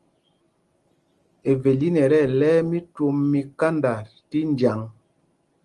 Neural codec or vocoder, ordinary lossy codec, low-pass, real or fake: none; Opus, 24 kbps; 10.8 kHz; real